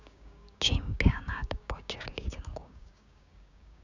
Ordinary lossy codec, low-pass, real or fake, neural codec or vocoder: none; 7.2 kHz; fake; codec, 16 kHz, 6 kbps, DAC